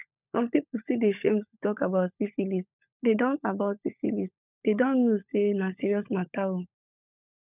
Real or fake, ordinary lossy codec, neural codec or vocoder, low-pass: fake; none; codec, 16 kHz, 16 kbps, FunCodec, trained on LibriTTS, 50 frames a second; 3.6 kHz